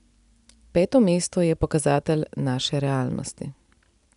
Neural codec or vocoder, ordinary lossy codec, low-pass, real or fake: none; none; 10.8 kHz; real